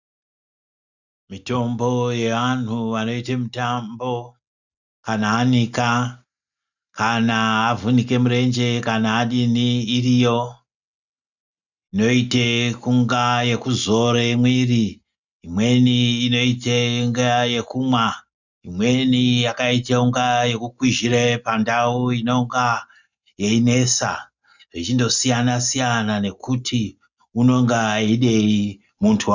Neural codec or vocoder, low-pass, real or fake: none; 7.2 kHz; real